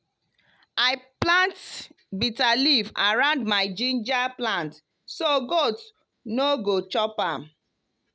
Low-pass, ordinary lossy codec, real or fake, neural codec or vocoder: none; none; real; none